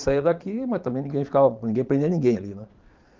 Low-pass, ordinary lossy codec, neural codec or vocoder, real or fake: 7.2 kHz; Opus, 24 kbps; vocoder, 22.05 kHz, 80 mel bands, Vocos; fake